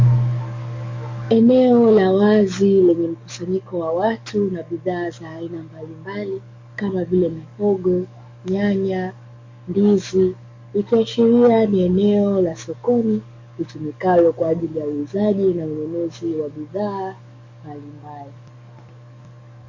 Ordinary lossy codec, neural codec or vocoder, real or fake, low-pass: MP3, 48 kbps; codec, 44.1 kHz, 7.8 kbps, DAC; fake; 7.2 kHz